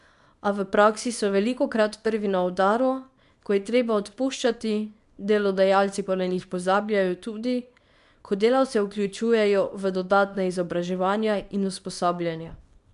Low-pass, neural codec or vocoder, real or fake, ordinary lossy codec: 10.8 kHz; codec, 24 kHz, 0.9 kbps, WavTokenizer, small release; fake; none